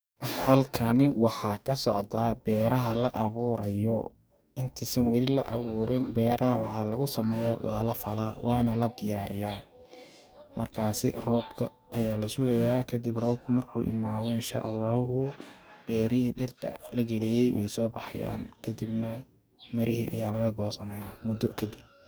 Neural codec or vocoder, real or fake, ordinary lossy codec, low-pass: codec, 44.1 kHz, 2.6 kbps, DAC; fake; none; none